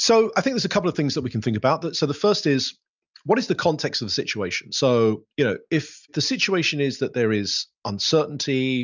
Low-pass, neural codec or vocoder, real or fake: 7.2 kHz; none; real